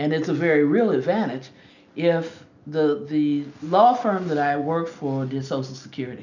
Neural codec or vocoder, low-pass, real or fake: none; 7.2 kHz; real